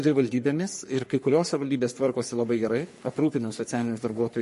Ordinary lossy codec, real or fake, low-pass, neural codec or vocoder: MP3, 48 kbps; fake; 14.4 kHz; codec, 44.1 kHz, 3.4 kbps, Pupu-Codec